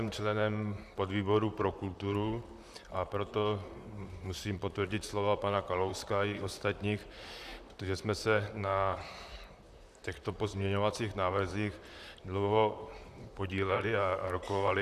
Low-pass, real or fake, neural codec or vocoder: 14.4 kHz; fake; vocoder, 44.1 kHz, 128 mel bands, Pupu-Vocoder